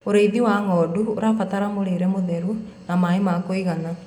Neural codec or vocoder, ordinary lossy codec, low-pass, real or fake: none; none; 19.8 kHz; real